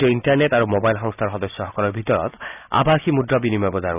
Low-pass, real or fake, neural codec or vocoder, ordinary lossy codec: 3.6 kHz; real; none; none